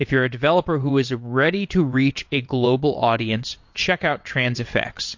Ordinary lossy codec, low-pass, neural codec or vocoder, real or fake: MP3, 48 kbps; 7.2 kHz; vocoder, 22.05 kHz, 80 mel bands, WaveNeXt; fake